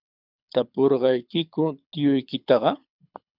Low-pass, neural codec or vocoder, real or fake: 5.4 kHz; codec, 24 kHz, 6 kbps, HILCodec; fake